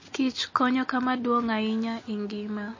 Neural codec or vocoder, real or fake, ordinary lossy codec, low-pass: none; real; MP3, 32 kbps; 7.2 kHz